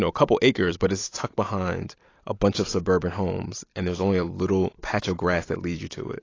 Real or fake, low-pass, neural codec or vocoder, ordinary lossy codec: fake; 7.2 kHz; vocoder, 44.1 kHz, 128 mel bands every 256 samples, BigVGAN v2; AAC, 32 kbps